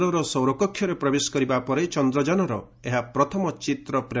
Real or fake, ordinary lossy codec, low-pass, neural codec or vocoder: real; none; none; none